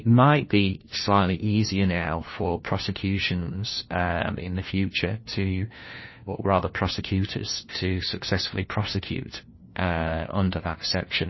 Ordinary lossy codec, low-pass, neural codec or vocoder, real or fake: MP3, 24 kbps; 7.2 kHz; codec, 16 kHz, 1 kbps, FunCodec, trained on LibriTTS, 50 frames a second; fake